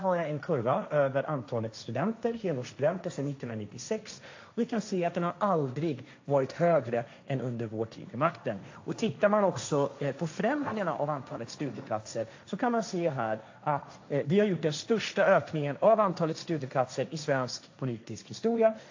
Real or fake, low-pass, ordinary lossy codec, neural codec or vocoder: fake; 7.2 kHz; AAC, 48 kbps; codec, 16 kHz, 1.1 kbps, Voila-Tokenizer